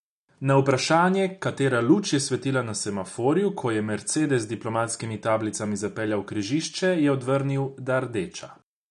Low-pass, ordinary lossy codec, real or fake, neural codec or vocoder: 10.8 kHz; none; real; none